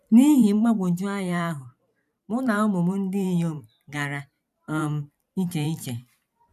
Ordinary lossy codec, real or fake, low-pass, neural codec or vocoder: none; fake; 14.4 kHz; vocoder, 44.1 kHz, 128 mel bands every 512 samples, BigVGAN v2